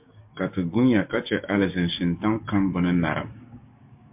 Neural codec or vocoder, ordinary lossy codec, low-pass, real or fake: codec, 16 kHz, 6 kbps, DAC; MP3, 32 kbps; 3.6 kHz; fake